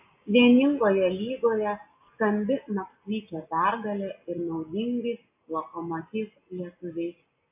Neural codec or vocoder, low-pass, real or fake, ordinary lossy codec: none; 3.6 kHz; real; AAC, 32 kbps